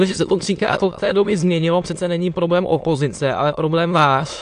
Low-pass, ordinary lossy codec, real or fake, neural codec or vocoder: 9.9 kHz; AAC, 64 kbps; fake; autoencoder, 22.05 kHz, a latent of 192 numbers a frame, VITS, trained on many speakers